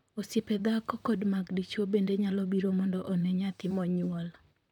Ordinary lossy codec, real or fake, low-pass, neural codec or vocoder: none; fake; 19.8 kHz; vocoder, 44.1 kHz, 128 mel bands, Pupu-Vocoder